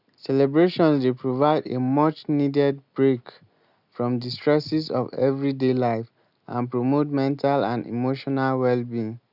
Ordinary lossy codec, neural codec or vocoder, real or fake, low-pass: none; none; real; 5.4 kHz